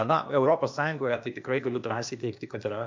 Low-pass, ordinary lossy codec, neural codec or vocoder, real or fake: 7.2 kHz; MP3, 48 kbps; codec, 16 kHz, 0.8 kbps, ZipCodec; fake